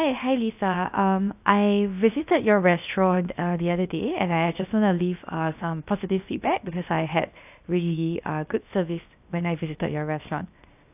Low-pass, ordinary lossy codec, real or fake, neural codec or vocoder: 3.6 kHz; AAC, 32 kbps; fake; codec, 16 kHz, 0.7 kbps, FocalCodec